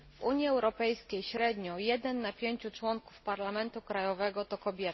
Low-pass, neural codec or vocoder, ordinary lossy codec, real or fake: 7.2 kHz; none; MP3, 24 kbps; real